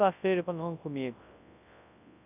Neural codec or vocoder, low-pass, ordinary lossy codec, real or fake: codec, 24 kHz, 0.9 kbps, WavTokenizer, large speech release; 3.6 kHz; none; fake